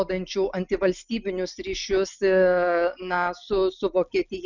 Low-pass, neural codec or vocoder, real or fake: 7.2 kHz; none; real